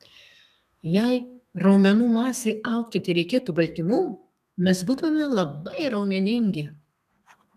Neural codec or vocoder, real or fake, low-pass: codec, 32 kHz, 1.9 kbps, SNAC; fake; 14.4 kHz